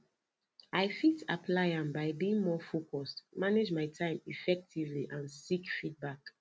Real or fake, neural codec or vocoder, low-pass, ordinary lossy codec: real; none; none; none